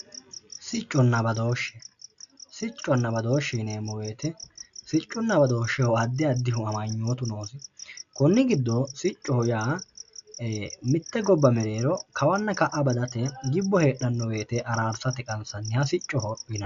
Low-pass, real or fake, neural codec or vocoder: 7.2 kHz; real; none